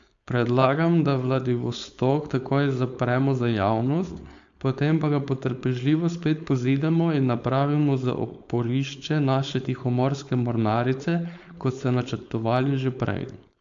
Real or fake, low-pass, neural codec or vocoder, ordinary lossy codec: fake; 7.2 kHz; codec, 16 kHz, 4.8 kbps, FACodec; AAC, 64 kbps